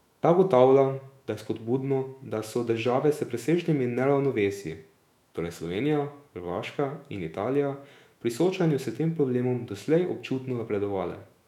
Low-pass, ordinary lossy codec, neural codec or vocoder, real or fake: 19.8 kHz; none; autoencoder, 48 kHz, 128 numbers a frame, DAC-VAE, trained on Japanese speech; fake